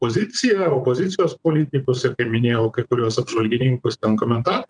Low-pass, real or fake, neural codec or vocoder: 9.9 kHz; fake; vocoder, 22.05 kHz, 80 mel bands, WaveNeXt